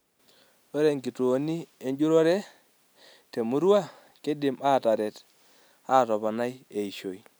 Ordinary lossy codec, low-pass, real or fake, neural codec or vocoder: none; none; real; none